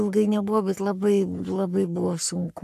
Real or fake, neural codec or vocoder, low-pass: fake; codec, 44.1 kHz, 3.4 kbps, Pupu-Codec; 14.4 kHz